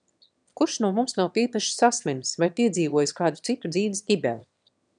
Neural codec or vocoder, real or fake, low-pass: autoencoder, 22.05 kHz, a latent of 192 numbers a frame, VITS, trained on one speaker; fake; 9.9 kHz